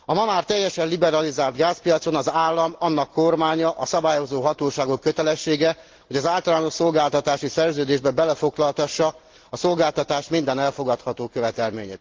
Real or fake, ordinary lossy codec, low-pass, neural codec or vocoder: real; Opus, 16 kbps; 7.2 kHz; none